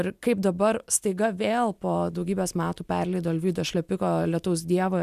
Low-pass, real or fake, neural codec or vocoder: 14.4 kHz; real; none